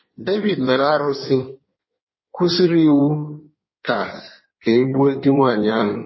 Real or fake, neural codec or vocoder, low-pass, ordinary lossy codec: fake; codec, 16 kHz in and 24 kHz out, 1.1 kbps, FireRedTTS-2 codec; 7.2 kHz; MP3, 24 kbps